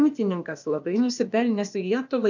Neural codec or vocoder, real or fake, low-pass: codec, 16 kHz, 0.8 kbps, ZipCodec; fake; 7.2 kHz